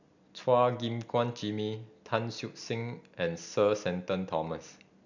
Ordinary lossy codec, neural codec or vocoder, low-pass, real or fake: none; none; 7.2 kHz; real